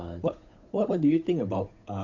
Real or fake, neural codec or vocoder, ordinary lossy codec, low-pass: fake; codec, 16 kHz, 8 kbps, FunCodec, trained on Chinese and English, 25 frames a second; none; 7.2 kHz